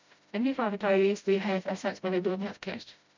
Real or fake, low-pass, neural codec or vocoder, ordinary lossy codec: fake; 7.2 kHz; codec, 16 kHz, 0.5 kbps, FreqCodec, smaller model; MP3, 64 kbps